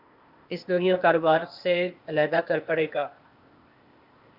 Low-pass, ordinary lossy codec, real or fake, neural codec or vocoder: 5.4 kHz; Opus, 64 kbps; fake; codec, 16 kHz, 0.8 kbps, ZipCodec